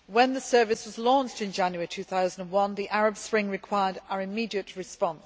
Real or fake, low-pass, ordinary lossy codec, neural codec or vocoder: real; none; none; none